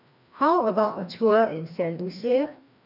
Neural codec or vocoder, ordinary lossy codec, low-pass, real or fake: codec, 16 kHz, 1 kbps, FreqCodec, larger model; none; 5.4 kHz; fake